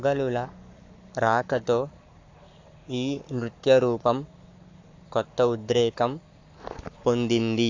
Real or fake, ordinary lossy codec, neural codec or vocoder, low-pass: fake; AAC, 48 kbps; codec, 44.1 kHz, 7.8 kbps, Pupu-Codec; 7.2 kHz